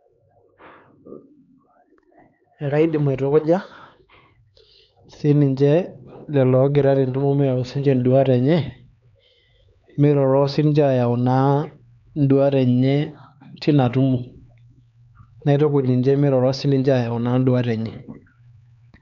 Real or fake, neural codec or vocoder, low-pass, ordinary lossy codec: fake; codec, 16 kHz, 4 kbps, X-Codec, HuBERT features, trained on LibriSpeech; 7.2 kHz; none